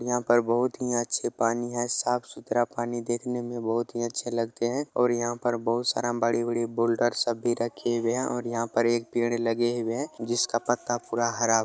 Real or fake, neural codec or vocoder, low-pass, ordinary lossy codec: real; none; none; none